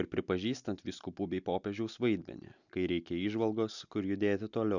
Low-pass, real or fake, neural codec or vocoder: 7.2 kHz; real; none